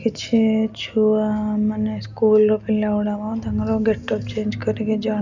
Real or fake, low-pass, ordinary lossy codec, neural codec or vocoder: real; 7.2 kHz; none; none